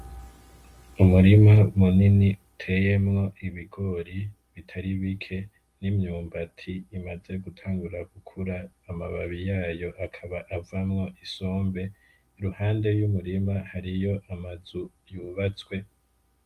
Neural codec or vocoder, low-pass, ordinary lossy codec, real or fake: none; 14.4 kHz; Opus, 32 kbps; real